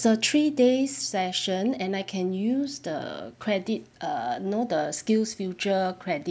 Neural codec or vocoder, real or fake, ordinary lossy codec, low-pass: none; real; none; none